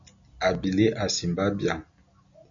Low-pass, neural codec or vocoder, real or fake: 7.2 kHz; none; real